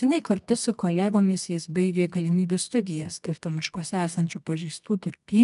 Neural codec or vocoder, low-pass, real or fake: codec, 24 kHz, 0.9 kbps, WavTokenizer, medium music audio release; 10.8 kHz; fake